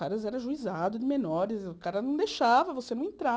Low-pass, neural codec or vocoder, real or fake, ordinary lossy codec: none; none; real; none